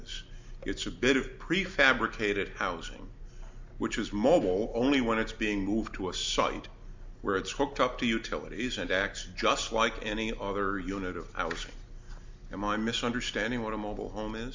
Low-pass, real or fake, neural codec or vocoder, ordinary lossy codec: 7.2 kHz; real; none; MP3, 64 kbps